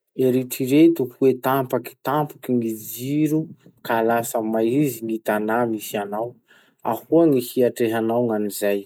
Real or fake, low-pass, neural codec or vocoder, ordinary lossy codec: real; none; none; none